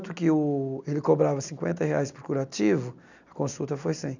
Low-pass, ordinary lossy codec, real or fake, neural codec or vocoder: 7.2 kHz; none; real; none